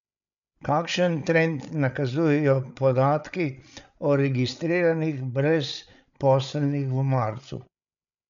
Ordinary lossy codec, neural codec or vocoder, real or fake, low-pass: none; codec, 16 kHz, 8 kbps, FreqCodec, larger model; fake; 7.2 kHz